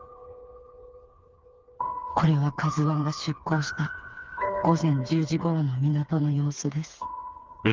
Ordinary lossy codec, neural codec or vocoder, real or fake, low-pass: Opus, 24 kbps; codec, 16 kHz, 4 kbps, FreqCodec, smaller model; fake; 7.2 kHz